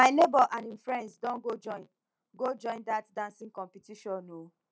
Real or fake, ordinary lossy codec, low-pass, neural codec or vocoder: real; none; none; none